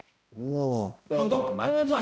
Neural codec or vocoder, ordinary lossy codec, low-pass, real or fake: codec, 16 kHz, 0.5 kbps, X-Codec, HuBERT features, trained on balanced general audio; none; none; fake